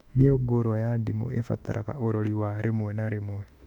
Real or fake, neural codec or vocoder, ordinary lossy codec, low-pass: fake; autoencoder, 48 kHz, 32 numbers a frame, DAC-VAE, trained on Japanese speech; none; 19.8 kHz